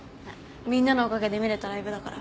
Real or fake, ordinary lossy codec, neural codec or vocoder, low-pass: real; none; none; none